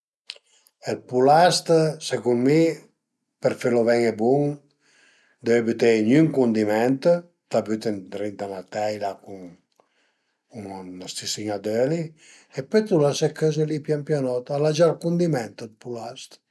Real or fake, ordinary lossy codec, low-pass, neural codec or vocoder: real; none; none; none